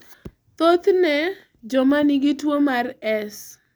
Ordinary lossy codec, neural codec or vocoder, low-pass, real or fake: none; none; none; real